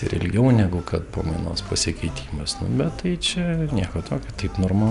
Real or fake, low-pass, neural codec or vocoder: real; 10.8 kHz; none